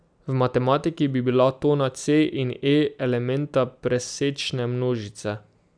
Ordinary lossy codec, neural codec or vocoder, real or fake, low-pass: none; none; real; 9.9 kHz